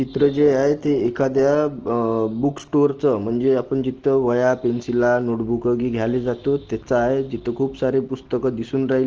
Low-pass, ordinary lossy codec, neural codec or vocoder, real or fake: 7.2 kHz; Opus, 16 kbps; none; real